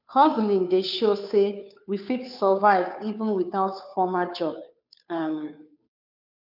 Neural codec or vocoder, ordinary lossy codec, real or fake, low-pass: codec, 16 kHz, 2 kbps, FunCodec, trained on Chinese and English, 25 frames a second; none; fake; 5.4 kHz